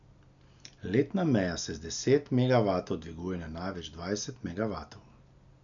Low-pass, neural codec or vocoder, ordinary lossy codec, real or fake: 7.2 kHz; none; MP3, 96 kbps; real